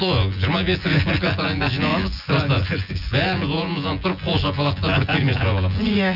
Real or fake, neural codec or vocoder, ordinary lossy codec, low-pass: fake; vocoder, 24 kHz, 100 mel bands, Vocos; none; 5.4 kHz